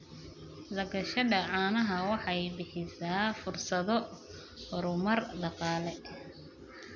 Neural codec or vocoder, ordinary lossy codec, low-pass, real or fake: none; none; 7.2 kHz; real